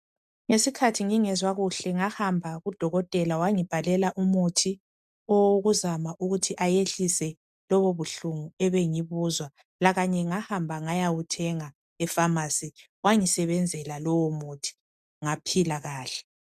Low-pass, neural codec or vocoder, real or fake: 14.4 kHz; none; real